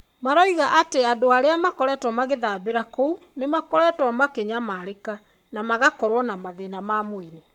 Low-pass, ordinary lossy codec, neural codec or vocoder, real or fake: 19.8 kHz; none; codec, 44.1 kHz, 7.8 kbps, Pupu-Codec; fake